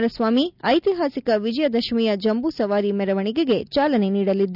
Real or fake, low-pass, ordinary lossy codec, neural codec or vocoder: real; 5.4 kHz; none; none